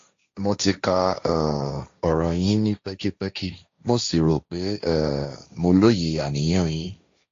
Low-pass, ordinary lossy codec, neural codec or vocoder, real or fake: 7.2 kHz; AAC, 48 kbps; codec, 16 kHz, 1.1 kbps, Voila-Tokenizer; fake